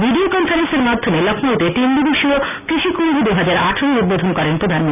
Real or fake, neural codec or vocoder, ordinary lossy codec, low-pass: real; none; none; 3.6 kHz